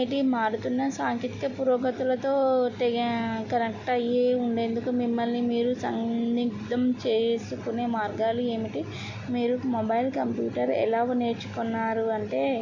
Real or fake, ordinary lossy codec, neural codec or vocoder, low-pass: real; Opus, 64 kbps; none; 7.2 kHz